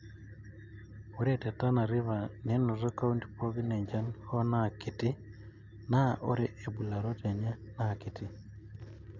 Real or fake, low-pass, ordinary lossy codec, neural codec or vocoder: real; 7.2 kHz; none; none